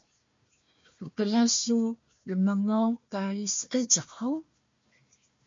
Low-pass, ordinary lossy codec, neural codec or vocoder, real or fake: 7.2 kHz; MP3, 48 kbps; codec, 16 kHz, 1 kbps, FunCodec, trained on Chinese and English, 50 frames a second; fake